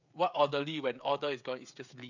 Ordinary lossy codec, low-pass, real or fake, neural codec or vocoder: none; 7.2 kHz; fake; codec, 16 kHz, 16 kbps, FreqCodec, smaller model